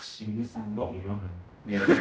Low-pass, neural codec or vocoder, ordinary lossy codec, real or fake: none; codec, 16 kHz, 0.5 kbps, X-Codec, HuBERT features, trained on general audio; none; fake